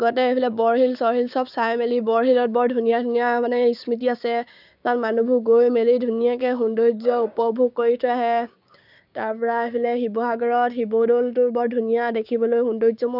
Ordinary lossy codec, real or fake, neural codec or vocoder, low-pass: none; fake; codec, 16 kHz, 6 kbps, DAC; 5.4 kHz